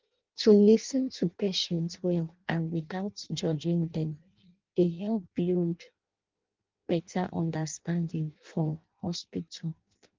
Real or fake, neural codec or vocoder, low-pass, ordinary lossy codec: fake; codec, 16 kHz in and 24 kHz out, 0.6 kbps, FireRedTTS-2 codec; 7.2 kHz; Opus, 24 kbps